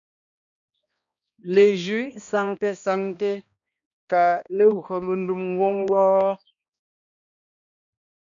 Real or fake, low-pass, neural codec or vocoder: fake; 7.2 kHz; codec, 16 kHz, 1 kbps, X-Codec, HuBERT features, trained on balanced general audio